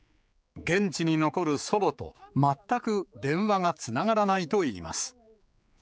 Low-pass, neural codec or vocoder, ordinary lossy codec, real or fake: none; codec, 16 kHz, 4 kbps, X-Codec, HuBERT features, trained on general audio; none; fake